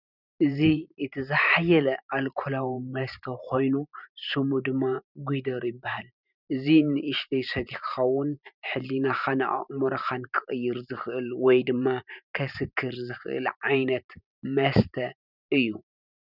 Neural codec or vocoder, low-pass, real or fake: vocoder, 44.1 kHz, 128 mel bands every 512 samples, BigVGAN v2; 5.4 kHz; fake